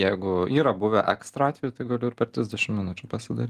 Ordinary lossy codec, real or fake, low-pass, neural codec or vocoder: Opus, 24 kbps; real; 14.4 kHz; none